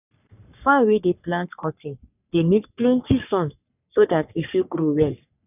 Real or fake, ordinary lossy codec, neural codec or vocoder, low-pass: fake; none; codec, 44.1 kHz, 3.4 kbps, Pupu-Codec; 3.6 kHz